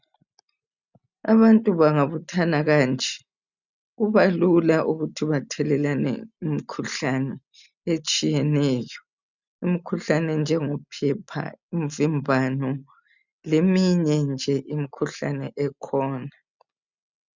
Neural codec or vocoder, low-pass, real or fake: none; 7.2 kHz; real